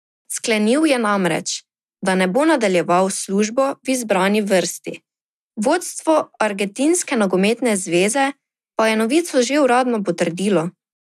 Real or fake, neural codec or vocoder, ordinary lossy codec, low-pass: real; none; none; none